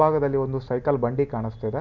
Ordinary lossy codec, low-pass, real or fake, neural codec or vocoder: none; 7.2 kHz; real; none